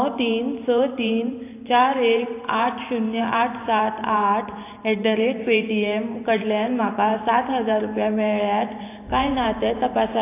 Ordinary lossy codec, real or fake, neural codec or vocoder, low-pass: AAC, 24 kbps; fake; vocoder, 44.1 kHz, 128 mel bands every 512 samples, BigVGAN v2; 3.6 kHz